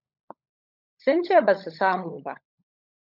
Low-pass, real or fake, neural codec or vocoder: 5.4 kHz; fake; codec, 16 kHz, 16 kbps, FunCodec, trained on LibriTTS, 50 frames a second